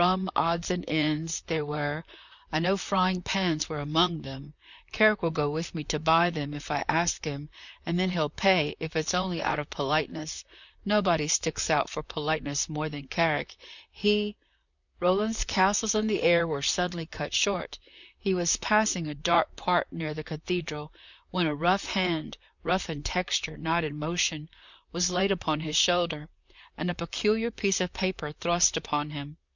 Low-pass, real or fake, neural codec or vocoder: 7.2 kHz; fake; vocoder, 44.1 kHz, 128 mel bands, Pupu-Vocoder